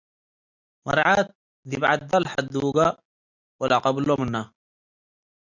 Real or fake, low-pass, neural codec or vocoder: real; 7.2 kHz; none